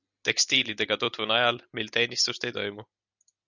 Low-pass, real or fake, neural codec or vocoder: 7.2 kHz; real; none